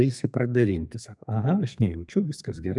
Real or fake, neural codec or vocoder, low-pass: fake; codec, 32 kHz, 1.9 kbps, SNAC; 10.8 kHz